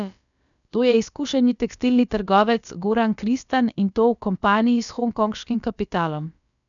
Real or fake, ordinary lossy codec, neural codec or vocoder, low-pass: fake; none; codec, 16 kHz, about 1 kbps, DyCAST, with the encoder's durations; 7.2 kHz